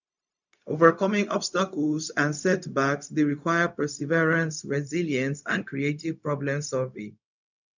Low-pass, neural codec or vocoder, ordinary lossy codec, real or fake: 7.2 kHz; codec, 16 kHz, 0.4 kbps, LongCat-Audio-Codec; none; fake